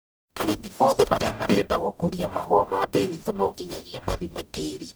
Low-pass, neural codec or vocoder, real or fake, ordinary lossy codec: none; codec, 44.1 kHz, 0.9 kbps, DAC; fake; none